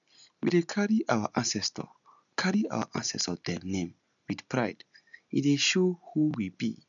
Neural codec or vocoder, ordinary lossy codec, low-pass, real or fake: none; none; 7.2 kHz; real